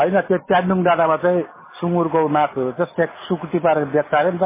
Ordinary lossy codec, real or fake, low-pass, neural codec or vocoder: MP3, 16 kbps; real; 3.6 kHz; none